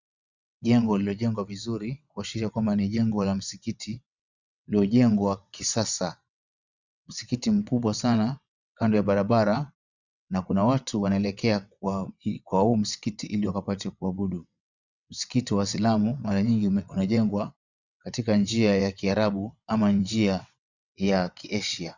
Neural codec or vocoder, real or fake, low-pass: vocoder, 22.05 kHz, 80 mel bands, WaveNeXt; fake; 7.2 kHz